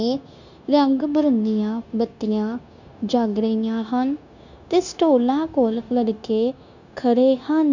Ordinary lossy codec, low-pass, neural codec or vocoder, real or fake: none; 7.2 kHz; codec, 16 kHz, 0.9 kbps, LongCat-Audio-Codec; fake